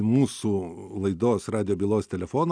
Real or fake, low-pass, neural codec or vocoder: real; 9.9 kHz; none